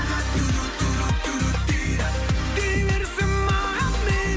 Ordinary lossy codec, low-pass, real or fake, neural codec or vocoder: none; none; real; none